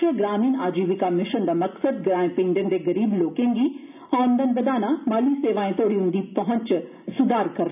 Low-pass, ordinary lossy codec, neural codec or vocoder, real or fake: 3.6 kHz; none; none; real